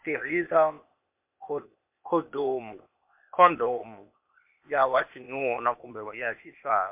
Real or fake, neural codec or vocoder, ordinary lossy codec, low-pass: fake; codec, 16 kHz, 0.8 kbps, ZipCodec; MP3, 32 kbps; 3.6 kHz